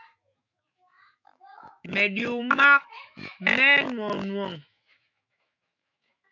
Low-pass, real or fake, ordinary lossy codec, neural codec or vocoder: 7.2 kHz; fake; MP3, 64 kbps; autoencoder, 48 kHz, 128 numbers a frame, DAC-VAE, trained on Japanese speech